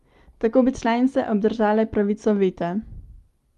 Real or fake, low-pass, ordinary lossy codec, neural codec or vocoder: real; 14.4 kHz; Opus, 32 kbps; none